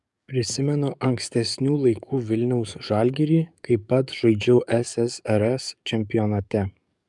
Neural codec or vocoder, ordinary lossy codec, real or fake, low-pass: codec, 44.1 kHz, 7.8 kbps, DAC; MP3, 96 kbps; fake; 10.8 kHz